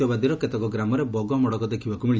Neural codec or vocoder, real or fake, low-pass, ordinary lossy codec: none; real; none; none